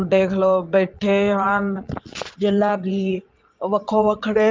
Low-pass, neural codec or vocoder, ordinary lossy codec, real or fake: 7.2 kHz; vocoder, 22.05 kHz, 80 mel bands, Vocos; Opus, 32 kbps; fake